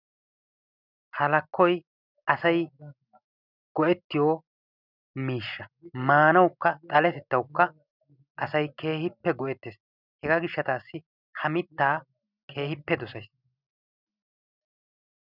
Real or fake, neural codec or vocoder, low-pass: real; none; 5.4 kHz